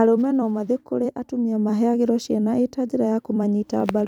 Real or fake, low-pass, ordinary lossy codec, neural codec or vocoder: fake; 19.8 kHz; none; vocoder, 44.1 kHz, 128 mel bands every 256 samples, BigVGAN v2